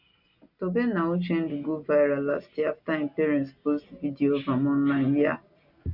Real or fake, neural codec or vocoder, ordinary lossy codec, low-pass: real; none; none; 5.4 kHz